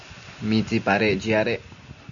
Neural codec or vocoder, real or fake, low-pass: none; real; 7.2 kHz